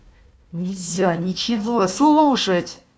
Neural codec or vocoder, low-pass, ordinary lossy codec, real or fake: codec, 16 kHz, 1 kbps, FunCodec, trained on Chinese and English, 50 frames a second; none; none; fake